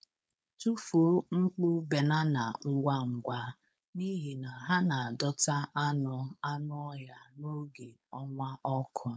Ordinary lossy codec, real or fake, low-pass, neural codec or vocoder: none; fake; none; codec, 16 kHz, 4.8 kbps, FACodec